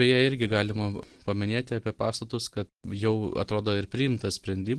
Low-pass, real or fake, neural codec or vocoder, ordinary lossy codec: 10.8 kHz; real; none; Opus, 16 kbps